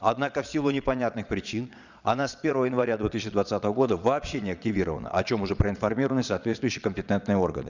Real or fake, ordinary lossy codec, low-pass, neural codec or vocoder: fake; none; 7.2 kHz; vocoder, 22.05 kHz, 80 mel bands, Vocos